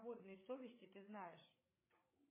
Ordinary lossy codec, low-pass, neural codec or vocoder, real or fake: MP3, 16 kbps; 3.6 kHz; codec, 16 kHz, 16 kbps, FreqCodec, larger model; fake